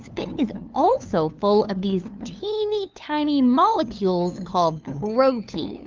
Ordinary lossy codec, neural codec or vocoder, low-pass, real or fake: Opus, 32 kbps; codec, 16 kHz, 2 kbps, FunCodec, trained on LibriTTS, 25 frames a second; 7.2 kHz; fake